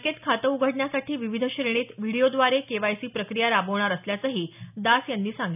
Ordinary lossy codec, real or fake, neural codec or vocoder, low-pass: none; real; none; 3.6 kHz